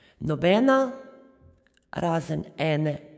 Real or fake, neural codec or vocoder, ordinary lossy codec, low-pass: fake; codec, 16 kHz, 6 kbps, DAC; none; none